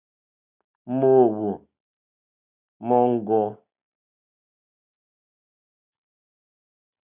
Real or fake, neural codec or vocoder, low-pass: real; none; 3.6 kHz